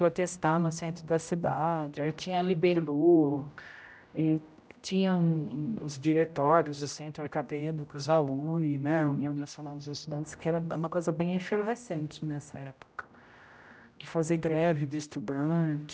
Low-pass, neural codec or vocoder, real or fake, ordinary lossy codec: none; codec, 16 kHz, 0.5 kbps, X-Codec, HuBERT features, trained on general audio; fake; none